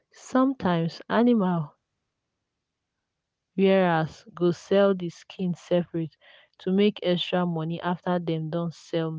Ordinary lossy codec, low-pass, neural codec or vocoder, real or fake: none; none; none; real